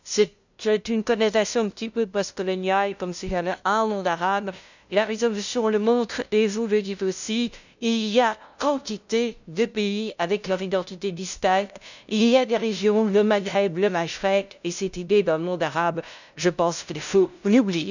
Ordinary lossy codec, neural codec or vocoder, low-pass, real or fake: none; codec, 16 kHz, 0.5 kbps, FunCodec, trained on LibriTTS, 25 frames a second; 7.2 kHz; fake